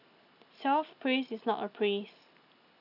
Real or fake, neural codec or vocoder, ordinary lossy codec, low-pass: real; none; none; 5.4 kHz